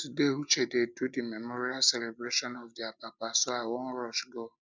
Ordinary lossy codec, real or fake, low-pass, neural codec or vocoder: none; real; none; none